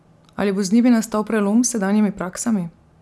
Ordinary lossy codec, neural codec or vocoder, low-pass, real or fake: none; none; none; real